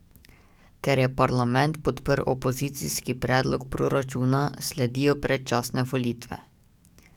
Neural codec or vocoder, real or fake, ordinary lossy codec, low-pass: codec, 44.1 kHz, 7.8 kbps, DAC; fake; none; 19.8 kHz